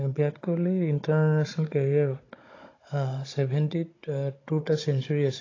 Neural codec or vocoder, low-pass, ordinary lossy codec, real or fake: codec, 16 kHz, 16 kbps, FunCodec, trained on Chinese and English, 50 frames a second; 7.2 kHz; AAC, 32 kbps; fake